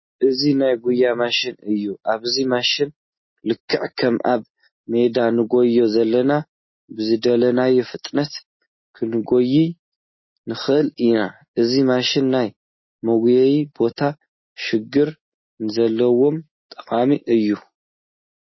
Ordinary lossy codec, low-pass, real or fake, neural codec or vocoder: MP3, 24 kbps; 7.2 kHz; real; none